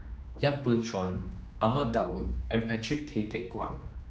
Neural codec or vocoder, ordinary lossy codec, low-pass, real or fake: codec, 16 kHz, 2 kbps, X-Codec, HuBERT features, trained on general audio; none; none; fake